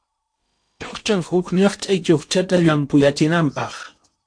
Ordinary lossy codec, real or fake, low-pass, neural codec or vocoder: MP3, 64 kbps; fake; 9.9 kHz; codec, 16 kHz in and 24 kHz out, 0.8 kbps, FocalCodec, streaming, 65536 codes